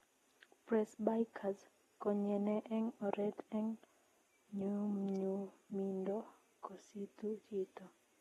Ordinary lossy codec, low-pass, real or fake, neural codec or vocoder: AAC, 32 kbps; 19.8 kHz; real; none